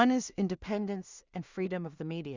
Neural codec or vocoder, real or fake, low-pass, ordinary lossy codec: codec, 16 kHz in and 24 kHz out, 0.4 kbps, LongCat-Audio-Codec, two codebook decoder; fake; 7.2 kHz; Opus, 64 kbps